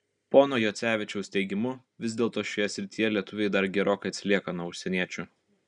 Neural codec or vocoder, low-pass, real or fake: none; 9.9 kHz; real